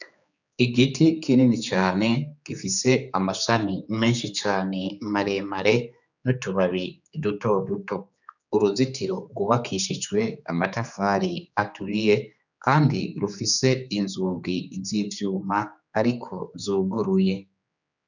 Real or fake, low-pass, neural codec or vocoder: fake; 7.2 kHz; codec, 16 kHz, 4 kbps, X-Codec, HuBERT features, trained on general audio